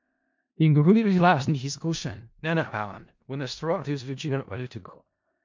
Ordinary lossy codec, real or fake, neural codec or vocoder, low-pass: MP3, 48 kbps; fake; codec, 16 kHz in and 24 kHz out, 0.4 kbps, LongCat-Audio-Codec, four codebook decoder; 7.2 kHz